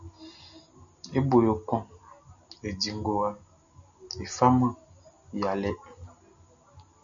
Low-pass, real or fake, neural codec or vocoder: 7.2 kHz; real; none